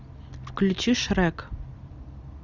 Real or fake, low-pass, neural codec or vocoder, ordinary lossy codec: real; 7.2 kHz; none; Opus, 64 kbps